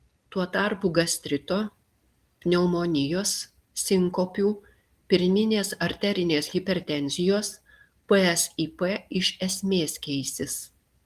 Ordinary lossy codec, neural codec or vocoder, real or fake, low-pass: Opus, 24 kbps; none; real; 14.4 kHz